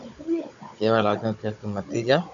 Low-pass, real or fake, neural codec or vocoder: 7.2 kHz; fake; codec, 16 kHz, 16 kbps, FunCodec, trained on Chinese and English, 50 frames a second